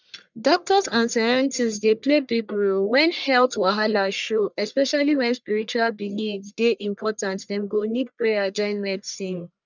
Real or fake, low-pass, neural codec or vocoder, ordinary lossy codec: fake; 7.2 kHz; codec, 44.1 kHz, 1.7 kbps, Pupu-Codec; none